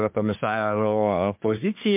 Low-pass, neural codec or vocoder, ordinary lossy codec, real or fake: 3.6 kHz; codec, 24 kHz, 1 kbps, SNAC; MP3, 32 kbps; fake